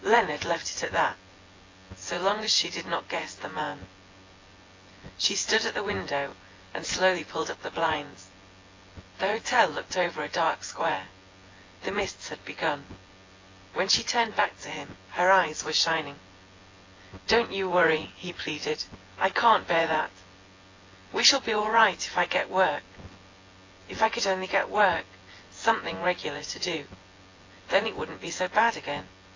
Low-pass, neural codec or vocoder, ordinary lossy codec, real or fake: 7.2 kHz; vocoder, 24 kHz, 100 mel bands, Vocos; AAC, 32 kbps; fake